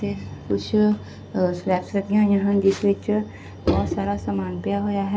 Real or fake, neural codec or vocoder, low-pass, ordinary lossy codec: real; none; none; none